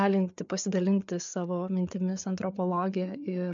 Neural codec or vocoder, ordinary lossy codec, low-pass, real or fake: codec, 16 kHz, 4 kbps, FreqCodec, larger model; MP3, 64 kbps; 7.2 kHz; fake